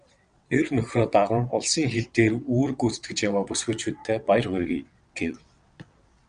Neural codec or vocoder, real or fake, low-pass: vocoder, 22.05 kHz, 80 mel bands, WaveNeXt; fake; 9.9 kHz